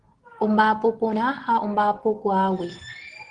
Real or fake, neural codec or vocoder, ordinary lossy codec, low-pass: real; none; Opus, 16 kbps; 9.9 kHz